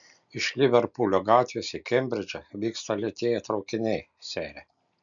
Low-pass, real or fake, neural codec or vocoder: 7.2 kHz; real; none